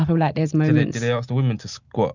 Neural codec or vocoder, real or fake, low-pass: none; real; 7.2 kHz